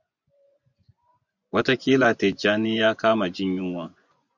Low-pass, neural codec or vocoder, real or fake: 7.2 kHz; vocoder, 24 kHz, 100 mel bands, Vocos; fake